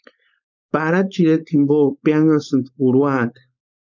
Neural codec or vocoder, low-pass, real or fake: codec, 16 kHz, 4.8 kbps, FACodec; 7.2 kHz; fake